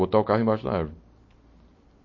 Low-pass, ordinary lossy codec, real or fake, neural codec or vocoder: 7.2 kHz; MP3, 32 kbps; real; none